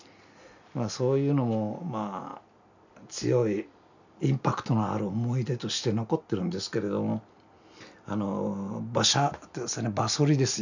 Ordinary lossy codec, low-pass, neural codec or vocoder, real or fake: none; 7.2 kHz; none; real